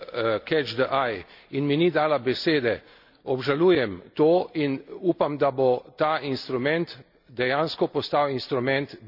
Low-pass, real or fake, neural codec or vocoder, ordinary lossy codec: 5.4 kHz; real; none; none